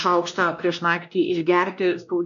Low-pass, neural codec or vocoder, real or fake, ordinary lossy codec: 7.2 kHz; codec, 16 kHz, 1 kbps, X-Codec, WavLM features, trained on Multilingual LibriSpeech; fake; MP3, 96 kbps